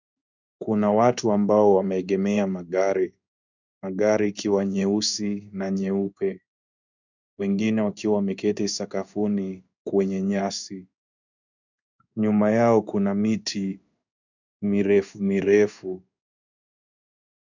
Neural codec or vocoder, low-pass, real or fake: codec, 16 kHz in and 24 kHz out, 1 kbps, XY-Tokenizer; 7.2 kHz; fake